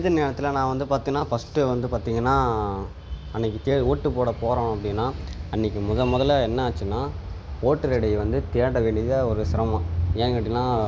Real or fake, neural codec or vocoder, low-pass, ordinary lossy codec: real; none; none; none